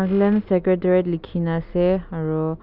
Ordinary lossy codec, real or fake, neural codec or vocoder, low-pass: none; real; none; 5.4 kHz